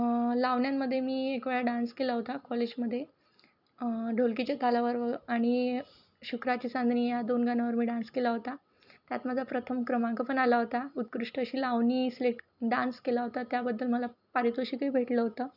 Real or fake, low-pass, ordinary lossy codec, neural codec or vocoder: real; 5.4 kHz; none; none